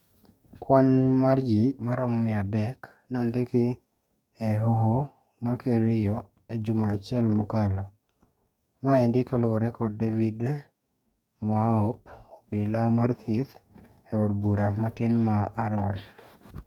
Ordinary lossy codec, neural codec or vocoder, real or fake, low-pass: MP3, 96 kbps; codec, 44.1 kHz, 2.6 kbps, DAC; fake; 19.8 kHz